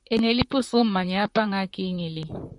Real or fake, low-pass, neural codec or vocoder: fake; 10.8 kHz; vocoder, 44.1 kHz, 128 mel bands, Pupu-Vocoder